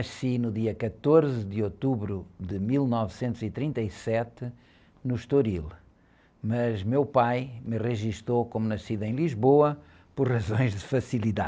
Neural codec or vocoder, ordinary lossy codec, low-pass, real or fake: none; none; none; real